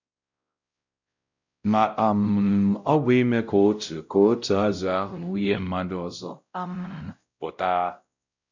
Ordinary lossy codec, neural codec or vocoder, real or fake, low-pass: none; codec, 16 kHz, 0.5 kbps, X-Codec, WavLM features, trained on Multilingual LibriSpeech; fake; 7.2 kHz